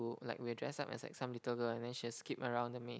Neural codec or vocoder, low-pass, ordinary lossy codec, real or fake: none; none; none; real